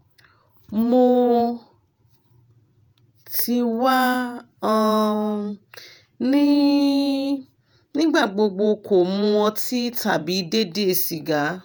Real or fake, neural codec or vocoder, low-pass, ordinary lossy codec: fake; vocoder, 48 kHz, 128 mel bands, Vocos; none; none